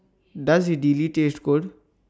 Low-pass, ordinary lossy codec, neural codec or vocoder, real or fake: none; none; none; real